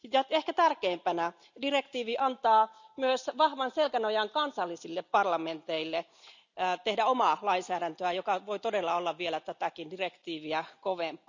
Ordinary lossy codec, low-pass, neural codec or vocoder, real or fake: none; 7.2 kHz; none; real